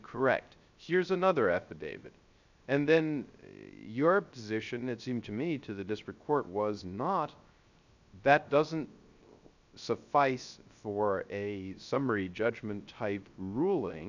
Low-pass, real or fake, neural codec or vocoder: 7.2 kHz; fake; codec, 16 kHz, 0.3 kbps, FocalCodec